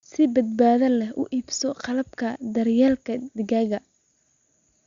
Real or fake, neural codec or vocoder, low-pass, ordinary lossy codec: real; none; 7.2 kHz; Opus, 64 kbps